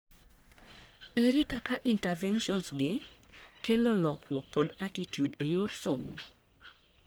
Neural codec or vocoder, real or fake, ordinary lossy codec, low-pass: codec, 44.1 kHz, 1.7 kbps, Pupu-Codec; fake; none; none